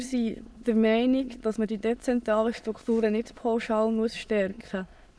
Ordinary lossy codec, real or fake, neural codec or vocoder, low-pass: none; fake; autoencoder, 22.05 kHz, a latent of 192 numbers a frame, VITS, trained on many speakers; none